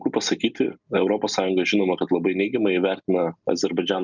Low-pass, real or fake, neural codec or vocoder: 7.2 kHz; real; none